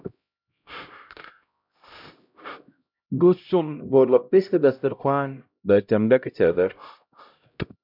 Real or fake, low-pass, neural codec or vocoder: fake; 5.4 kHz; codec, 16 kHz, 0.5 kbps, X-Codec, HuBERT features, trained on LibriSpeech